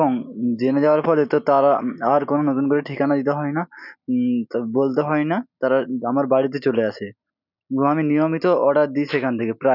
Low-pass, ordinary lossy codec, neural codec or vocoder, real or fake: 5.4 kHz; none; none; real